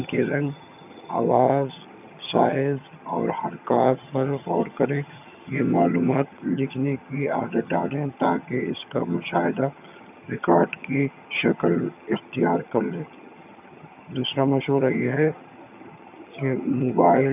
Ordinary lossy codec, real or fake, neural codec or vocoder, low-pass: none; fake; vocoder, 22.05 kHz, 80 mel bands, HiFi-GAN; 3.6 kHz